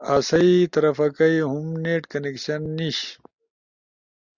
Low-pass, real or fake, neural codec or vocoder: 7.2 kHz; real; none